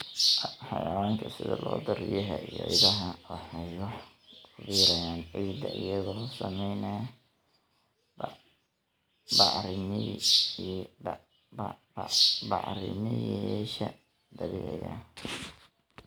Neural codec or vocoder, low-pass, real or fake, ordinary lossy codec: none; none; real; none